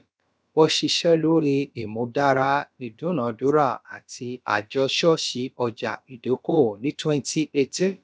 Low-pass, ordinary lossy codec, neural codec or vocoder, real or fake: none; none; codec, 16 kHz, about 1 kbps, DyCAST, with the encoder's durations; fake